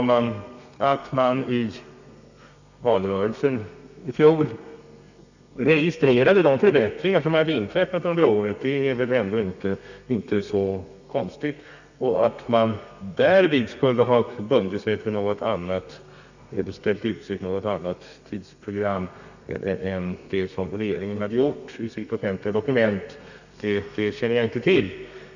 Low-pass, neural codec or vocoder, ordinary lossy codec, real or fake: 7.2 kHz; codec, 32 kHz, 1.9 kbps, SNAC; none; fake